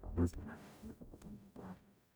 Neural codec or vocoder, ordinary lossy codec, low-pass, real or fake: codec, 44.1 kHz, 0.9 kbps, DAC; none; none; fake